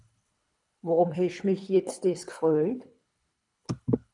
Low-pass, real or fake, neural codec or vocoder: 10.8 kHz; fake; codec, 24 kHz, 3 kbps, HILCodec